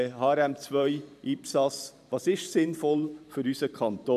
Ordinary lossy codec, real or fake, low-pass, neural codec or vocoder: none; fake; 14.4 kHz; vocoder, 44.1 kHz, 128 mel bands every 512 samples, BigVGAN v2